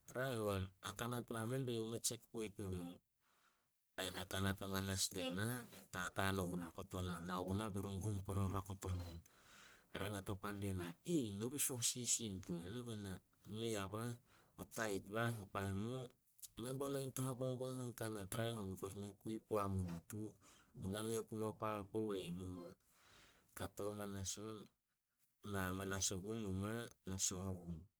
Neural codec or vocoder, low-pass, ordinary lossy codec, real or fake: codec, 44.1 kHz, 1.7 kbps, Pupu-Codec; none; none; fake